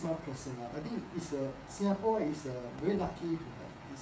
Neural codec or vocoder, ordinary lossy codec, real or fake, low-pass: codec, 16 kHz, 16 kbps, FreqCodec, smaller model; none; fake; none